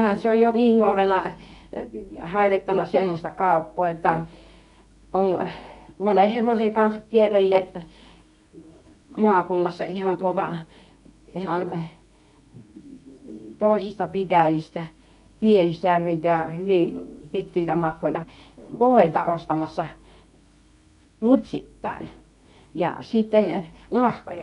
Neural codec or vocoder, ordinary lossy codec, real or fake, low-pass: codec, 24 kHz, 0.9 kbps, WavTokenizer, medium music audio release; none; fake; 10.8 kHz